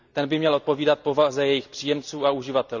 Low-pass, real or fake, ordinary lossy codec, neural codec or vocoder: 7.2 kHz; real; none; none